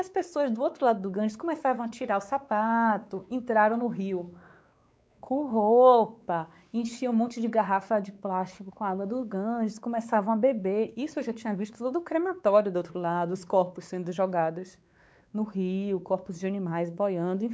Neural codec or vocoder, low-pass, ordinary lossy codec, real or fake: codec, 16 kHz, 4 kbps, X-Codec, WavLM features, trained on Multilingual LibriSpeech; none; none; fake